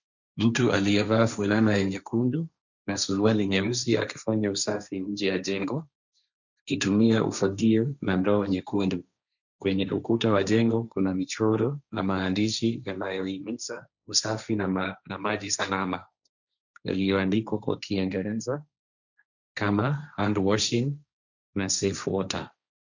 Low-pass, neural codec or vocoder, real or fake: 7.2 kHz; codec, 16 kHz, 1.1 kbps, Voila-Tokenizer; fake